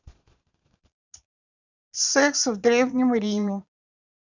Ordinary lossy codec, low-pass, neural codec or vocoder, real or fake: none; 7.2 kHz; vocoder, 22.05 kHz, 80 mel bands, Vocos; fake